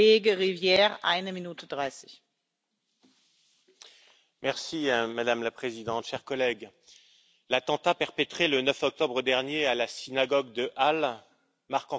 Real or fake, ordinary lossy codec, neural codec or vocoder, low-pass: real; none; none; none